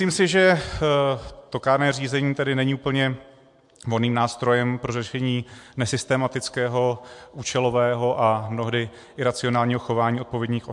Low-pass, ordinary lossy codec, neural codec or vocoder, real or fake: 10.8 kHz; MP3, 64 kbps; none; real